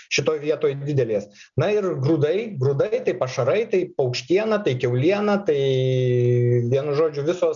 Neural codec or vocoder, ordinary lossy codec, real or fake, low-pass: none; MP3, 96 kbps; real; 7.2 kHz